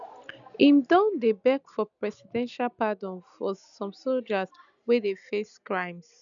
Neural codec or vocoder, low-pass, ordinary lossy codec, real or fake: none; 7.2 kHz; none; real